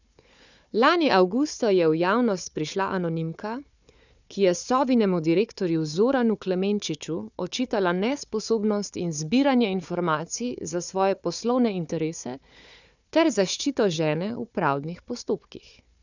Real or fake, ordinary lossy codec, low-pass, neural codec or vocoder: fake; none; 7.2 kHz; codec, 16 kHz, 4 kbps, FunCodec, trained on Chinese and English, 50 frames a second